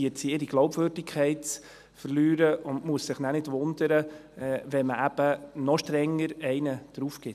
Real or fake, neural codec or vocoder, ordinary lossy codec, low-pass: real; none; none; 14.4 kHz